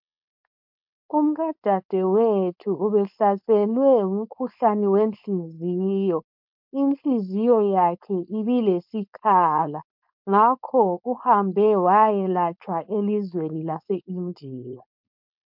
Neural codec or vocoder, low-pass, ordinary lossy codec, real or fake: codec, 16 kHz, 4.8 kbps, FACodec; 5.4 kHz; MP3, 48 kbps; fake